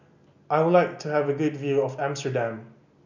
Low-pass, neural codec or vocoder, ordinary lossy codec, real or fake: 7.2 kHz; none; none; real